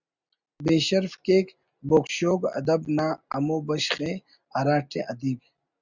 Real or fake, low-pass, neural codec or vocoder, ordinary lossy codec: real; 7.2 kHz; none; Opus, 64 kbps